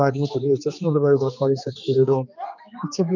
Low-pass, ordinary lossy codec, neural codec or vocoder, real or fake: 7.2 kHz; none; codec, 16 kHz, 2 kbps, X-Codec, HuBERT features, trained on general audio; fake